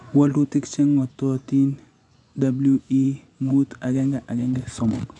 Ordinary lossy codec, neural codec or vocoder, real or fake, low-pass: none; vocoder, 24 kHz, 100 mel bands, Vocos; fake; 10.8 kHz